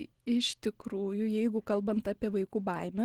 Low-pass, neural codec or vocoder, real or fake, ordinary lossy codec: 19.8 kHz; none; real; Opus, 16 kbps